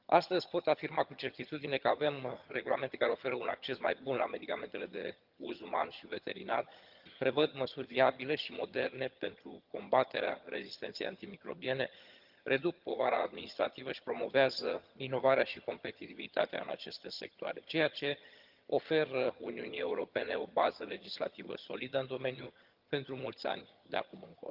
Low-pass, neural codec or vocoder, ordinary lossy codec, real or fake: 5.4 kHz; vocoder, 22.05 kHz, 80 mel bands, HiFi-GAN; Opus, 32 kbps; fake